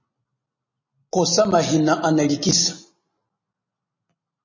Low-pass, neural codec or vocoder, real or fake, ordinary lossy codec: 7.2 kHz; none; real; MP3, 32 kbps